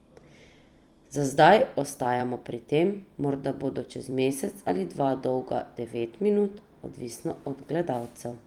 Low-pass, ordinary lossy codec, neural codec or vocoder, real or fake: 19.8 kHz; Opus, 32 kbps; vocoder, 44.1 kHz, 128 mel bands every 256 samples, BigVGAN v2; fake